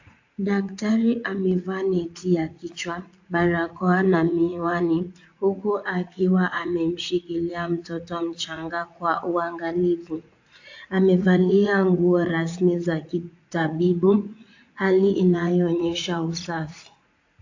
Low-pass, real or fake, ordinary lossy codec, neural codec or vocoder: 7.2 kHz; fake; AAC, 48 kbps; vocoder, 44.1 kHz, 80 mel bands, Vocos